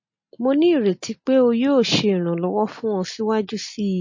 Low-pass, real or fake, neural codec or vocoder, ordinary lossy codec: 7.2 kHz; real; none; MP3, 32 kbps